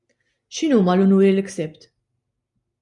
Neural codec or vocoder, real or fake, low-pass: none; real; 10.8 kHz